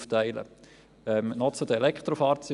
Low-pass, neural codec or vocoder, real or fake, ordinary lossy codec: 10.8 kHz; none; real; none